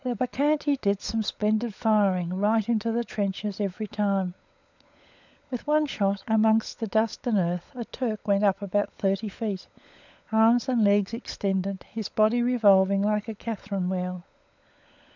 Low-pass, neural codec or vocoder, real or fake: 7.2 kHz; codec, 16 kHz, 16 kbps, FunCodec, trained on LibriTTS, 50 frames a second; fake